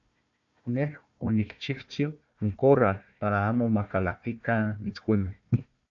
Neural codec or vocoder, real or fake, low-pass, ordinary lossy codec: codec, 16 kHz, 1 kbps, FunCodec, trained on Chinese and English, 50 frames a second; fake; 7.2 kHz; AAC, 64 kbps